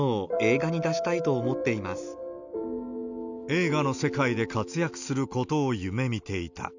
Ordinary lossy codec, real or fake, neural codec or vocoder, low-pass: none; real; none; 7.2 kHz